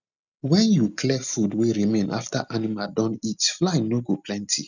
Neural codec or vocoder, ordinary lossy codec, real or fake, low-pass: none; none; real; 7.2 kHz